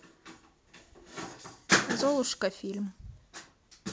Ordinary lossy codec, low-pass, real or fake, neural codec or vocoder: none; none; real; none